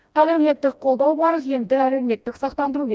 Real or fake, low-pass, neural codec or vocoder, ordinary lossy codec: fake; none; codec, 16 kHz, 1 kbps, FreqCodec, smaller model; none